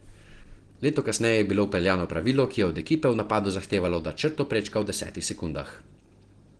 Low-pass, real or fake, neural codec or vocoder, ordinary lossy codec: 9.9 kHz; real; none; Opus, 16 kbps